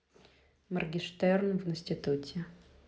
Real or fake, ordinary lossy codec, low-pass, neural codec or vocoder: real; none; none; none